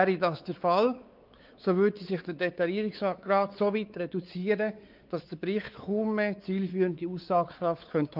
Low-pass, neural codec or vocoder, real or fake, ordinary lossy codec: 5.4 kHz; codec, 16 kHz, 4 kbps, X-Codec, WavLM features, trained on Multilingual LibriSpeech; fake; Opus, 24 kbps